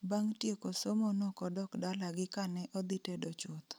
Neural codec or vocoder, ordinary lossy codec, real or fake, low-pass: none; none; real; none